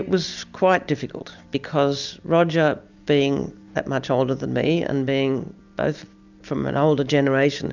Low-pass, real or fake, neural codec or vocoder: 7.2 kHz; real; none